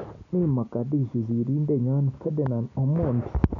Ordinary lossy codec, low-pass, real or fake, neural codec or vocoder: none; 7.2 kHz; real; none